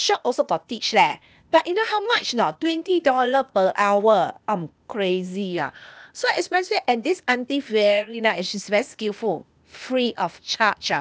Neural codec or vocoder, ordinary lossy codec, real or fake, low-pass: codec, 16 kHz, 0.8 kbps, ZipCodec; none; fake; none